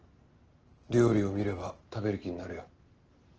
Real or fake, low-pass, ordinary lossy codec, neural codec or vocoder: real; 7.2 kHz; Opus, 16 kbps; none